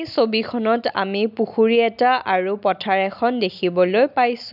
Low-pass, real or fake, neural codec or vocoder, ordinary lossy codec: 5.4 kHz; real; none; none